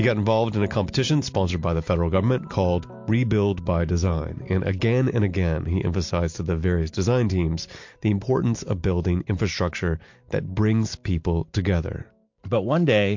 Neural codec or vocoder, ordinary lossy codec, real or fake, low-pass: none; MP3, 48 kbps; real; 7.2 kHz